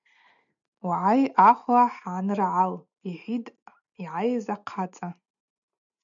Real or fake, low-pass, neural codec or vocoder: real; 7.2 kHz; none